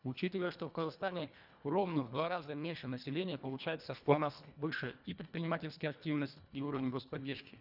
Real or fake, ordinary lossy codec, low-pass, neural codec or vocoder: fake; none; 5.4 kHz; codec, 24 kHz, 1.5 kbps, HILCodec